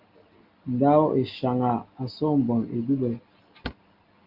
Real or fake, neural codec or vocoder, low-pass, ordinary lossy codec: real; none; 5.4 kHz; Opus, 24 kbps